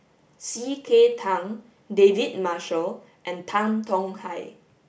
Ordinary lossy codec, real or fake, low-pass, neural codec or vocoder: none; real; none; none